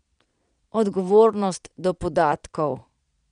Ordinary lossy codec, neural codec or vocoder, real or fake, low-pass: none; vocoder, 22.05 kHz, 80 mel bands, Vocos; fake; 9.9 kHz